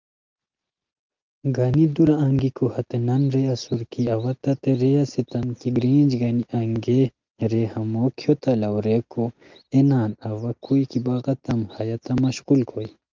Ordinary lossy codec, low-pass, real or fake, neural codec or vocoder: Opus, 24 kbps; 7.2 kHz; real; none